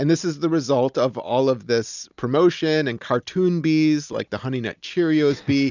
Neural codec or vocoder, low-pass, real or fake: none; 7.2 kHz; real